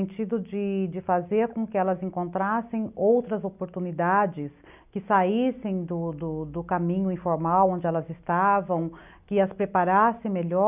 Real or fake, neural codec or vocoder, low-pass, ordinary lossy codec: real; none; 3.6 kHz; none